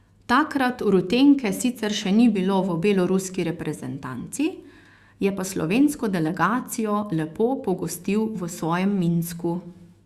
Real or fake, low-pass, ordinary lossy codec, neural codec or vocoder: fake; 14.4 kHz; Opus, 64 kbps; autoencoder, 48 kHz, 128 numbers a frame, DAC-VAE, trained on Japanese speech